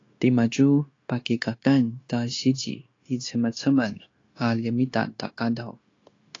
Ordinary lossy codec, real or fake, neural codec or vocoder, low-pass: AAC, 32 kbps; fake; codec, 16 kHz, 0.9 kbps, LongCat-Audio-Codec; 7.2 kHz